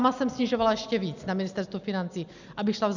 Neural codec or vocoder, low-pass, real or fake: none; 7.2 kHz; real